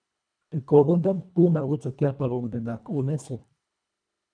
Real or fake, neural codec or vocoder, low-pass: fake; codec, 24 kHz, 1.5 kbps, HILCodec; 9.9 kHz